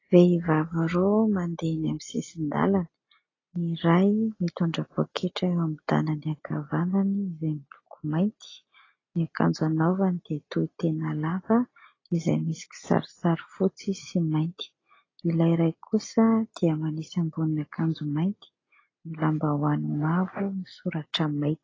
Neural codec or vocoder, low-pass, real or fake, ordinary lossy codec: none; 7.2 kHz; real; AAC, 32 kbps